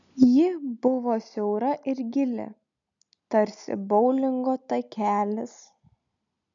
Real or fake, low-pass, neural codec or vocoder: real; 7.2 kHz; none